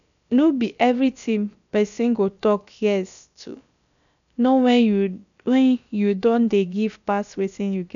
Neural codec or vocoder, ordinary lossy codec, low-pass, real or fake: codec, 16 kHz, about 1 kbps, DyCAST, with the encoder's durations; none; 7.2 kHz; fake